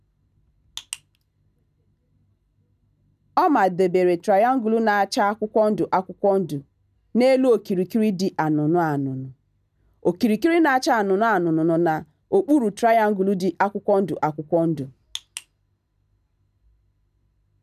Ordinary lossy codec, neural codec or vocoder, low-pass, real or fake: none; none; 14.4 kHz; real